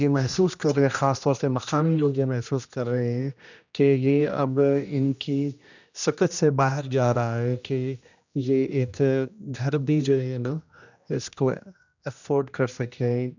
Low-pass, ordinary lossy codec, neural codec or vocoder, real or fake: 7.2 kHz; none; codec, 16 kHz, 1 kbps, X-Codec, HuBERT features, trained on general audio; fake